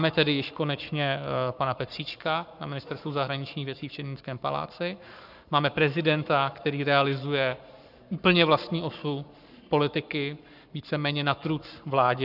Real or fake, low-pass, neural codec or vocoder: fake; 5.4 kHz; codec, 44.1 kHz, 7.8 kbps, Pupu-Codec